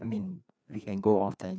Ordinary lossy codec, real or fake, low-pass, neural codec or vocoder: none; fake; none; codec, 16 kHz, 2 kbps, FreqCodec, larger model